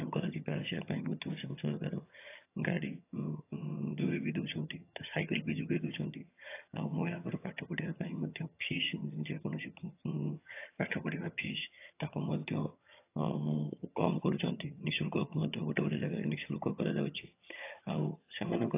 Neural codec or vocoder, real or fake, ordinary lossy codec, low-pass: vocoder, 22.05 kHz, 80 mel bands, HiFi-GAN; fake; AAC, 24 kbps; 3.6 kHz